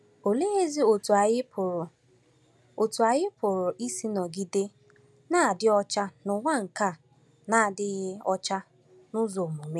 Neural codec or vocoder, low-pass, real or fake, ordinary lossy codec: none; none; real; none